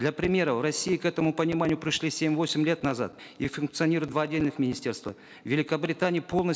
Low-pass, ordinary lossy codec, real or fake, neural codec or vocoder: none; none; real; none